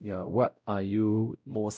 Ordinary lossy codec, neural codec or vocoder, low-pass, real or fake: none; codec, 16 kHz, 0.5 kbps, X-Codec, WavLM features, trained on Multilingual LibriSpeech; none; fake